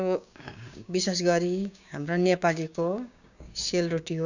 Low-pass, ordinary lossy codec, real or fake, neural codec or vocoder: 7.2 kHz; none; fake; codec, 16 kHz, 6 kbps, DAC